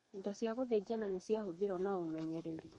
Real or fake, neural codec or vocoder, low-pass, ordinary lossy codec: fake; codec, 32 kHz, 1.9 kbps, SNAC; 14.4 kHz; MP3, 48 kbps